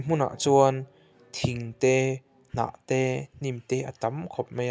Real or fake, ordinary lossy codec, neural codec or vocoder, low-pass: real; none; none; none